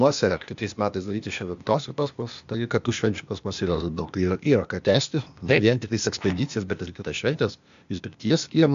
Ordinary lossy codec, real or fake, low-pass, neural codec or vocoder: MP3, 64 kbps; fake; 7.2 kHz; codec, 16 kHz, 0.8 kbps, ZipCodec